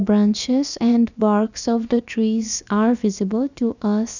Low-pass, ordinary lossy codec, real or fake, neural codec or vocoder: 7.2 kHz; none; fake; codec, 16 kHz, about 1 kbps, DyCAST, with the encoder's durations